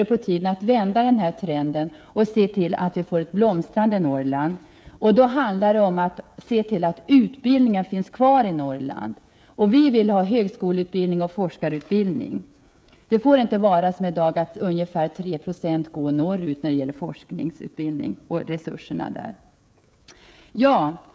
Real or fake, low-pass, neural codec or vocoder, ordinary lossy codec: fake; none; codec, 16 kHz, 16 kbps, FreqCodec, smaller model; none